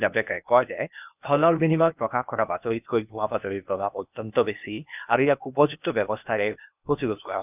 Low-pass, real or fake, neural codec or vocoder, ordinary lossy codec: 3.6 kHz; fake; codec, 16 kHz, 0.8 kbps, ZipCodec; none